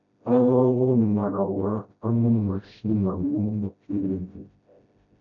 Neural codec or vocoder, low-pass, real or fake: codec, 16 kHz, 0.5 kbps, FreqCodec, smaller model; 7.2 kHz; fake